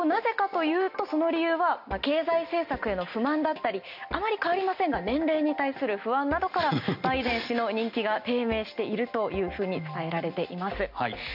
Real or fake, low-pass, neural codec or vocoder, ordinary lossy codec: real; 5.4 kHz; none; none